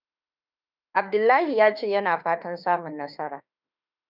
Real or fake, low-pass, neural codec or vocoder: fake; 5.4 kHz; autoencoder, 48 kHz, 32 numbers a frame, DAC-VAE, trained on Japanese speech